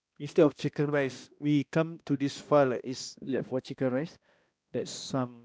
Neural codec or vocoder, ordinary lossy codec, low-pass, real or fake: codec, 16 kHz, 1 kbps, X-Codec, HuBERT features, trained on balanced general audio; none; none; fake